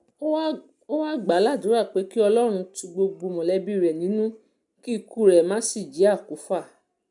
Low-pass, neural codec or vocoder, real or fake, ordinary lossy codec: 10.8 kHz; none; real; none